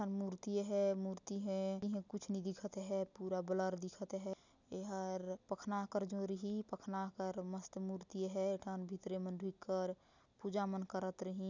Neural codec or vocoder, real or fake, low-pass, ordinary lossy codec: none; real; 7.2 kHz; none